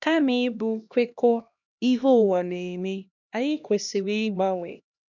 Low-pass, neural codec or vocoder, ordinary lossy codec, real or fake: 7.2 kHz; codec, 16 kHz, 1 kbps, X-Codec, HuBERT features, trained on LibriSpeech; none; fake